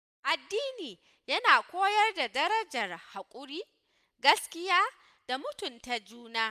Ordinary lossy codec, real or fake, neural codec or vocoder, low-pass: none; real; none; 14.4 kHz